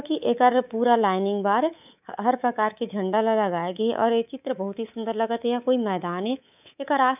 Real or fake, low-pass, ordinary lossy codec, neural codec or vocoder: fake; 3.6 kHz; none; codec, 24 kHz, 3.1 kbps, DualCodec